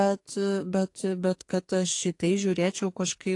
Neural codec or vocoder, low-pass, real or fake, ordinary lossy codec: codec, 44.1 kHz, 2.6 kbps, SNAC; 10.8 kHz; fake; AAC, 48 kbps